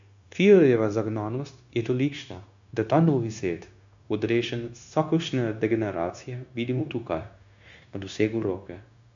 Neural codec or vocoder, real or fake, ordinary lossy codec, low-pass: codec, 16 kHz, 0.9 kbps, LongCat-Audio-Codec; fake; AAC, 64 kbps; 7.2 kHz